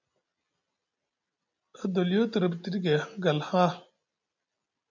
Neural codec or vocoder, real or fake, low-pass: none; real; 7.2 kHz